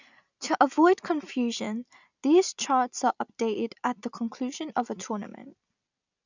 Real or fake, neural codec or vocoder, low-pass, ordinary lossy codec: real; none; 7.2 kHz; none